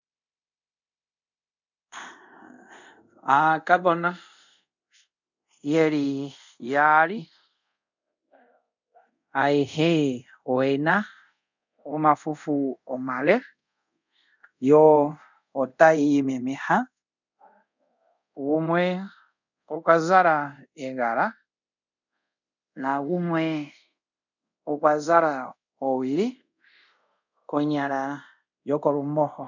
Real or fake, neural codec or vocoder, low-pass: fake; codec, 24 kHz, 0.5 kbps, DualCodec; 7.2 kHz